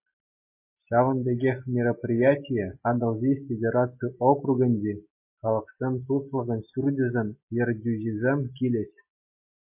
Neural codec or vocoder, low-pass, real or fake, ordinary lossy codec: none; 3.6 kHz; real; MP3, 24 kbps